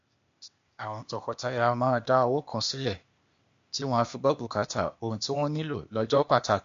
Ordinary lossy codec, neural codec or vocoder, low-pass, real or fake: MP3, 48 kbps; codec, 16 kHz, 0.8 kbps, ZipCodec; 7.2 kHz; fake